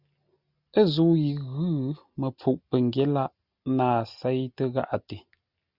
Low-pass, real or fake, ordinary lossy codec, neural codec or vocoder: 5.4 kHz; real; Opus, 64 kbps; none